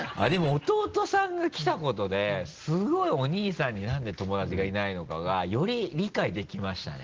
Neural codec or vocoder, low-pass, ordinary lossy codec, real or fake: none; 7.2 kHz; Opus, 16 kbps; real